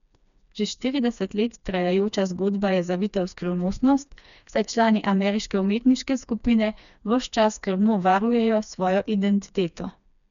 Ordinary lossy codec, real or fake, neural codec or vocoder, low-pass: none; fake; codec, 16 kHz, 2 kbps, FreqCodec, smaller model; 7.2 kHz